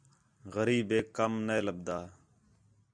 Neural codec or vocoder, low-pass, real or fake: none; 9.9 kHz; real